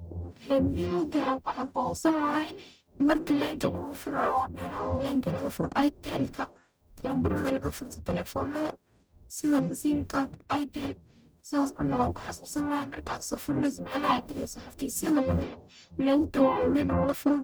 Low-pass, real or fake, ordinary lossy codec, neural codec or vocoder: none; fake; none; codec, 44.1 kHz, 0.9 kbps, DAC